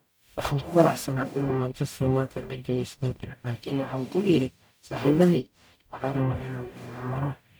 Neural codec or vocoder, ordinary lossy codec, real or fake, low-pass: codec, 44.1 kHz, 0.9 kbps, DAC; none; fake; none